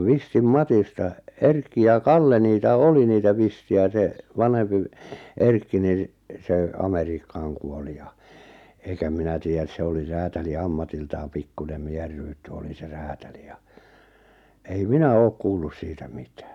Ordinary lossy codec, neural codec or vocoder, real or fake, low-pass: none; none; real; 19.8 kHz